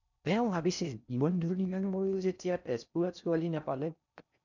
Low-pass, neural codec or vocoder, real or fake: 7.2 kHz; codec, 16 kHz in and 24 kHz out, 0.6 kbps, FocalCodec, streaming, 4096 codes; fake